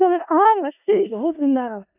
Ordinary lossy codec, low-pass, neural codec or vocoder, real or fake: none; 3.6 kHz; codec, 16 kHz in and 24 kHz out, 0.4 kbps, LongCat-Audio-Codec, four codebook decoder; fake